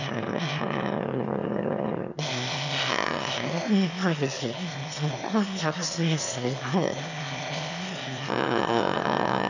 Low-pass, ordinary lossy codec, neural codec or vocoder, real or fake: 7.2 kHz; none; autoencoder, 22.05 kHz, a latent of 192 numbers a frame, VITS, trained on one speaker; fake